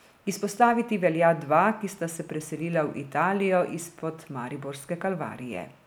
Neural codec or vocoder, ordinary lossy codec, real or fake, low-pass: none; none; real; none